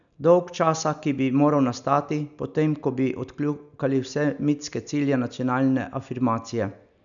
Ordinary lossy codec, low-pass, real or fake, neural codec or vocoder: none; 7.2 kHz; real; none